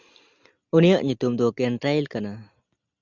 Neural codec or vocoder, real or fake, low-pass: none; real; 7.2 kHz